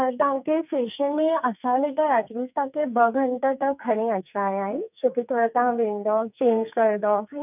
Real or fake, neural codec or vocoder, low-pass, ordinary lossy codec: fake; codec, 44.1 kHz, 2.6 kbps, SNAC; 3.6 kHz; none